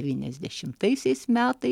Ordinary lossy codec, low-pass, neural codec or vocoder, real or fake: MP3, 96 kbps; 19.8 kHz; none; real